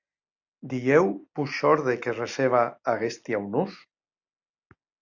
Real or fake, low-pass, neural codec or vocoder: real; 7.2 kHz; none